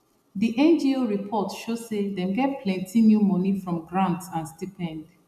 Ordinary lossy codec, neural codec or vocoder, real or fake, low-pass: none; none; real; 14.4 kHz